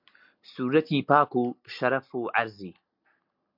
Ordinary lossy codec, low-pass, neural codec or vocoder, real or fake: MP3, 48 kbps; 5.4 kHz; none; real